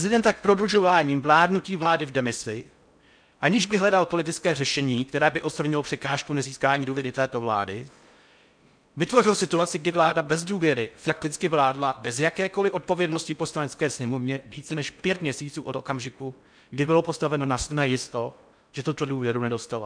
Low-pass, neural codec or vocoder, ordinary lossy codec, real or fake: 9.9 kHz; codec, 16 kHz in and 24 kHz out, 0.6 kbps, FocalCodec, streaming, 4096 codes; MP3, 96 kbps; fake